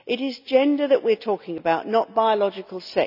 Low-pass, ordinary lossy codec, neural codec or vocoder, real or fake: 5.4 kHz; none; none; real